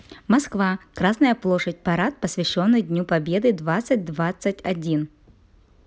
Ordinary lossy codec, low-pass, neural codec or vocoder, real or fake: none; none; none; real